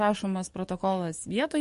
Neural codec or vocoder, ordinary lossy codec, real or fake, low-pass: codec, 44.1 kHz, 7.8 kbps, DAC; MP3, 48 kbps; fake; 14.4 kHz